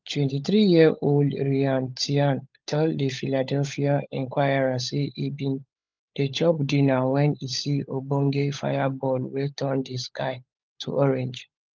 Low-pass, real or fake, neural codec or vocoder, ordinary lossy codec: 7.2 kHz; fake; codec, 16 kHz, 16 kbps, FunCodec, trained on LibriTTS, 50 frames a second; Opus, 32 kbps